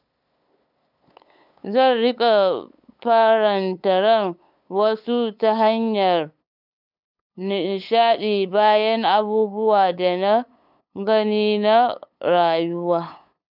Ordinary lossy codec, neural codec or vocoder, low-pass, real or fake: none; codec, 16 kHz, 8 kbps, FunCodec, trained on LibriTTS, 25 frames a second; 5.4 kHz; fake